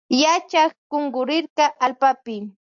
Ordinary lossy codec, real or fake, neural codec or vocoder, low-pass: AAC, 64 kbps; real; none; 7.2 kHz